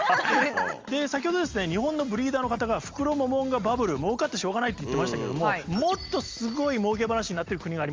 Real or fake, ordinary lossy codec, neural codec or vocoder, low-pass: real; Opus, 32 kbps; none; 7.2 kHz